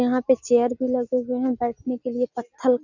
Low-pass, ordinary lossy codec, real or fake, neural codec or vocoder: 7.2 kHz; none; real; none